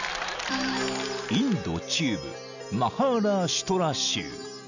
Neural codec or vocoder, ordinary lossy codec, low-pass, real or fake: none; none; 7.2 kHz; real